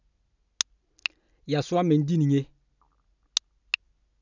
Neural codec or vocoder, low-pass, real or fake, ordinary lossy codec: none; 7.2 kHz; real; none